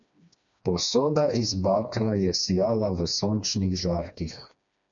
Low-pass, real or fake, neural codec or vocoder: 7.2 kHz; fake; codec, 16 kHz, 2 kbps, FreqCodec, smaller model